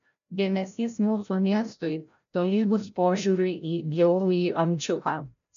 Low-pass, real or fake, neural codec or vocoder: 7.2 kHz; fake; codec, 16 kHz, 0.5 kbps, FreqCodec, larger model